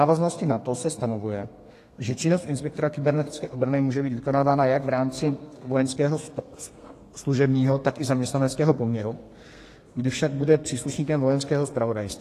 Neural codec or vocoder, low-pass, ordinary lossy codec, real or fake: codec, 32 kHz, 1.9 kbps, SNAC; 14.4 kHz; AAC, 48 kbps; fake